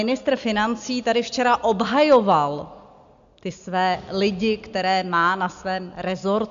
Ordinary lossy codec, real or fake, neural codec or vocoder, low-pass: MP3, 64 kbps; real; none; 7.2 kHz